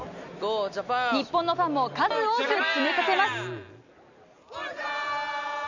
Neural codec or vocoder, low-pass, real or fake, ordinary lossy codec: none; 7.2 kHz; real; none